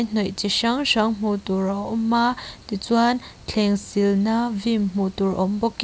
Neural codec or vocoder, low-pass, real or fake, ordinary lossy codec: none; none; real; none